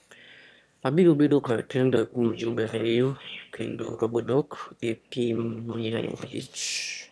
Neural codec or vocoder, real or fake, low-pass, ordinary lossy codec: autoencoder, 22.05 kHz, a latent of 192 numbers a frame, VITS, trained on one speaker; fake; none; none